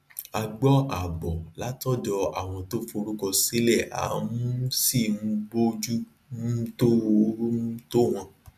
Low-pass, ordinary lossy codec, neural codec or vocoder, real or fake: 14.4 kHz; none; none; real